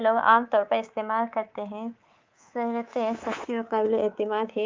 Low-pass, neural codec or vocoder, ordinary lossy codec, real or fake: 7.2 kHz; codec, 24 kHz, 3.1 kbps, DualCodec; Opus, 24 kbps; fake